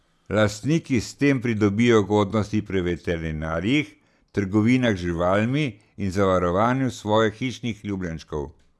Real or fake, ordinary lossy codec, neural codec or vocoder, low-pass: real; none; none; none